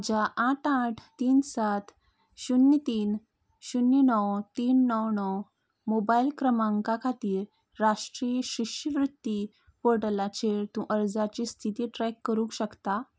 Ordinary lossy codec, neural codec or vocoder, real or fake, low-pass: none; none; real; none